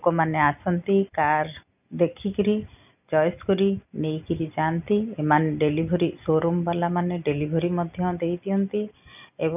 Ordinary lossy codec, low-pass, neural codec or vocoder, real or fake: none; 3.6 kHz; none; real